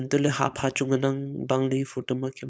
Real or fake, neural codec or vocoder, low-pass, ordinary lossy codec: fake; codec, 16 kHz, 4.8 kbps, FACodec; none; none